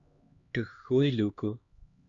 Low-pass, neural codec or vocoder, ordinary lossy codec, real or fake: 7.2 kHz; codec, 16 kHz, 2 kbps, X-Codec, HuBERT features, trained on LibriSpeech; Opus, 64 kbps; fake